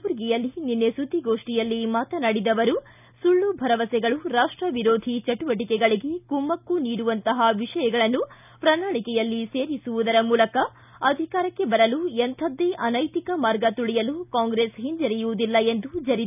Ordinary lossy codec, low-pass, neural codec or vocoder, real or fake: none; 3.6 kHz; none; real